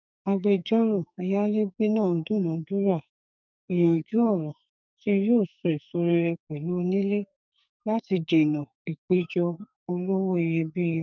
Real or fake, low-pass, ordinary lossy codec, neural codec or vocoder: fake; 7.2 kHz; none; codec, 44.1 kHz, 2.6 kbps, SNAC